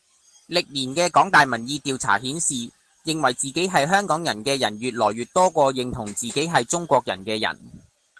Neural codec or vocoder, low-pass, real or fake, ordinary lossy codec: none; 10.8 kHz; real; Opus, 16 kbps